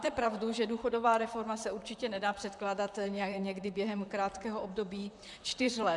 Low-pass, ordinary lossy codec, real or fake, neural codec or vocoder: 10.8 kHz; MP3, 96 kbps; fake; vocoder, 44.1 kHz, 128 mel bands, Pupu-Vocoder